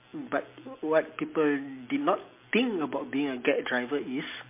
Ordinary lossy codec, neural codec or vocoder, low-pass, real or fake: MP3, 24 kbps; none; 3.6 kHz; real